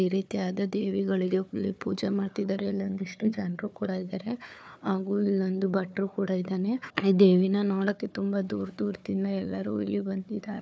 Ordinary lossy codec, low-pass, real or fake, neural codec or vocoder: none; none; fake; codec, 16 kHz, 4 kbps, FunCodec, trained on Chinese and English, 50 frames a second